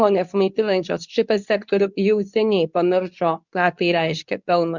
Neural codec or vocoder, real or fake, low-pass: codec, 24 kHz, 0.9 kbps, WavTokenizer, medium speech release version 2; fake; 7.2 kHz